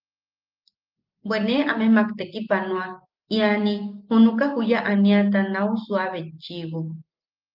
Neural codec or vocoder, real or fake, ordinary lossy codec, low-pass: none; real; Opus, 24 kbps; 5.4 kHz